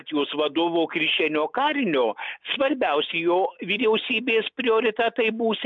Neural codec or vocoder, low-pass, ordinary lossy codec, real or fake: none; 7.2 kHz; MP3, 64 kbps; real